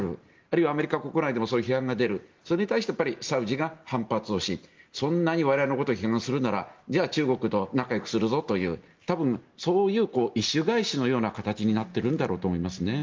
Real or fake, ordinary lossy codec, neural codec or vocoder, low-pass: real; Opus, 32 kbps; none; 7.2 kHz